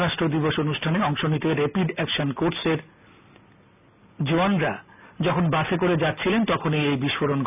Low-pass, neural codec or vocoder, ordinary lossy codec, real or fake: 3.6 kHz; none; none; real